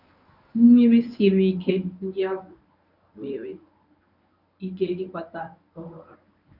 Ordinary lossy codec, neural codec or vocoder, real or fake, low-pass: none; codec, 24 kHz, 0.9 kbps, WavTokenizer, medium speech release version 1; fake; 5.4 kHz